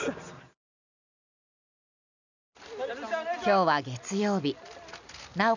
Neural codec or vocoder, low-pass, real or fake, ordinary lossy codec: none; 7.2 kHz; real; none